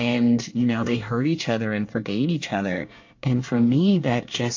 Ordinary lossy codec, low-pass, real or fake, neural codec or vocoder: AAC, 48 kbps; 7.2 kHz; fake; codec, 24 kHz, 1 kbps, SNAC